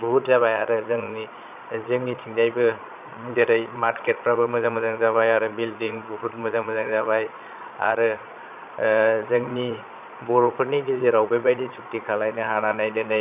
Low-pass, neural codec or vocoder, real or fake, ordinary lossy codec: 3.6 kHz; vocoder, 22.05 kHz, 80 mel bands, Vocos; fake; none